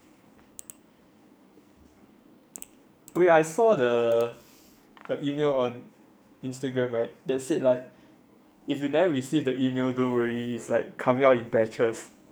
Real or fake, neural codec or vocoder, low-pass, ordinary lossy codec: fake; codec, 44.1 kHz, 2.6 kbps, SNAC; none; none